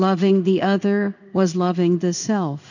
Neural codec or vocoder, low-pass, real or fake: codec, 16 kHz in and 24 kHz out, 1 kbps, XY-Tokenizer; 7.2 kHz; fake